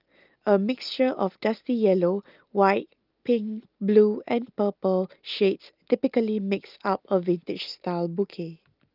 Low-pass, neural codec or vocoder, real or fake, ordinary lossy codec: 5.4 kHz; none; real; Opus, 32 kbps